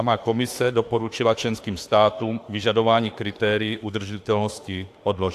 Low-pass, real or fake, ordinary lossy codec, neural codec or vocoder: 14.4 kHz; fake; AAC, 64 kbps; autoencoder, 48 kHz, 32 numbers a frame, DAC-VAE, trained on Japanese speech